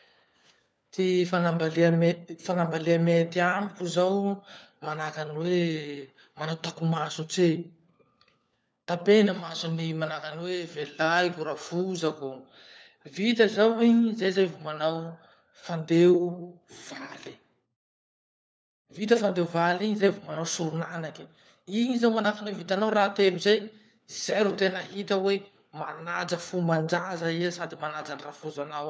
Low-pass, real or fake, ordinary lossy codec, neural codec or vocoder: none; fake; none; codec, 16 kHz, 4 kbps, FunCodec, trained on LibriTTS, 50 frames a second